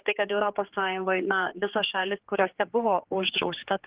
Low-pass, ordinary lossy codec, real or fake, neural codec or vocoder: 3.6 kHz; Opus, 16 kbps; fake; codec, 16 kHz, 4 kbps, X-Codec, HuBERT features, trained on general audio